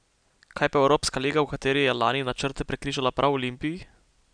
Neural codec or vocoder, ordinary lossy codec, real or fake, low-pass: none; none; real; 9.9 kHz